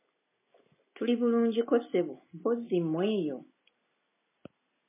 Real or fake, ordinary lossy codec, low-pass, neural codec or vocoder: real; MP3, 16 kbps; 3.6 kHz; none